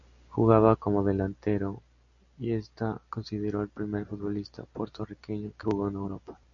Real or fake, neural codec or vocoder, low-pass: real; none; 7.2 kHz